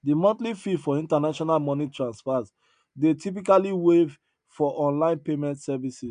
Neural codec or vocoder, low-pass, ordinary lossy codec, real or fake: none; 9.9 kHz; AAC, 96 kbps; real